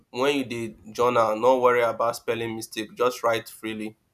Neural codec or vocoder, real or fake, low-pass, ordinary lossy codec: none; real; 14.4 kHz; none